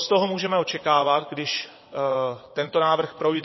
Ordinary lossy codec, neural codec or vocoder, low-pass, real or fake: MP3, 24 kbps; vocoder, 22.05 kHz, 80 mel bands, WaveNeXt; 7.2 kHz; fake